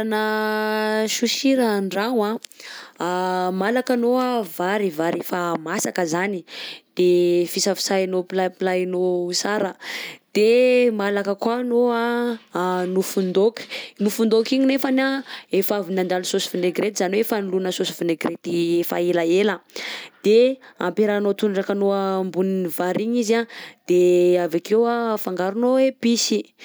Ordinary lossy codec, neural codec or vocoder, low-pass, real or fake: none; none; none; real